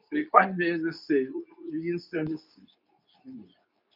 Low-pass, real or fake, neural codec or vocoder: 5.4 kHz; fake; codec, 24 kHz, 0.9 kbps, WavTokenizer, medium speech release version 2